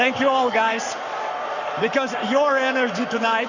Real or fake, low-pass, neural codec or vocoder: fake; 7.2 kHz; codec, 44.1 kHz, 7.8 kbps, Pupu-Codec